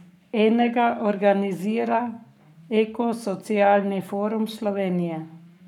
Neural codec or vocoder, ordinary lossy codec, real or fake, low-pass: codec, 44.1 kHz, 7.8 kbps, Pupu-Codec; none; fake; 19.8 kHz